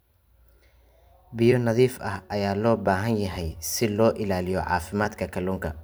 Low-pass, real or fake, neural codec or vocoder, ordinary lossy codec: none; fake; vocoder, 44.1 kHz, 128 mel bands every 256 samples, BigVGAN v2; none